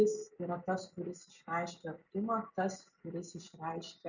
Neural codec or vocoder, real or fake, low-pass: none; real; 7.2 kHz